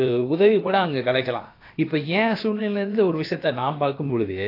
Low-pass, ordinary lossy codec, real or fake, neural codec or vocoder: 5.4 kHz; AAC, 32 kbps; fake; codec, 16 kHz, about 1 kbps, DyCAST, with the encoder's durations